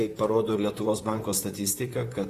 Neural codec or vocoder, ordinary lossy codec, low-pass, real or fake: vocoder, 44.1 kHz, 128 mel bands, Pupu-Vocoder; AAC, 48 kbps; 14.4 kHz; fake